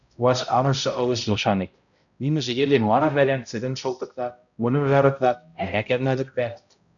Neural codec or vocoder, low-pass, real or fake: codec, 16 kHz, 0.5 kbps, X-Codec, HuBERT features, trained on balanced general audio; 7.2 kHz; fake